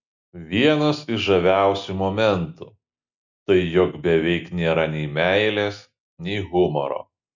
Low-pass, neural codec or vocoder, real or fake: 7.2 kHz; none; real